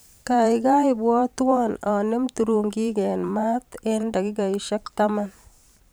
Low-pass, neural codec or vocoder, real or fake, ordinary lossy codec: none; vocoder, 44.1 kHz, 128 mel bands every 512 samples, BigVGAN v2; fake; none